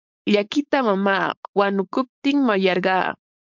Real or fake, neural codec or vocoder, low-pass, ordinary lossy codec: fake; codec, 16 kHz, 4.8 kbps, FACodec; 7.2 kHz; MP3, 64 kbps